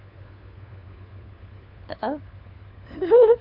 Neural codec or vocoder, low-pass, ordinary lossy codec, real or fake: codec, 16 kHz, 4 kbps, FunCodec, trained on LibriTTS, 50 frames a second; 5.4 kHz; none; fake